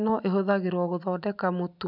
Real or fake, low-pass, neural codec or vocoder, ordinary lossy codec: real; 5.4 kHz; none; none